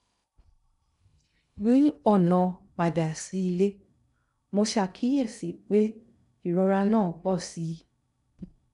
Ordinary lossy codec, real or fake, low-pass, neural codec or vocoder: none; fake; 10.8 kHz; codec, 16 kHz in and 24 kHz out, 0.6 kbps, FocalCodec, streaming, 2048 codes